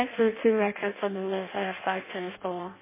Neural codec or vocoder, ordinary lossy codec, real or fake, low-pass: codec, 16 kHz in and 24 kHz out, 0.6 kbps, FireRedTTS-2 codec; MP3, 16 kbps; fake; 3.6 kHz